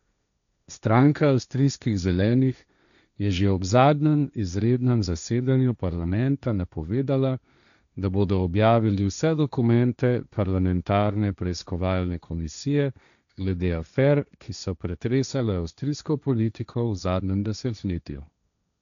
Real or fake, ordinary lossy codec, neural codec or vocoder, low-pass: fake; none; codec, 16 kHz, 1.1 kbps, Voila-Tokenizer; 7.2 kHz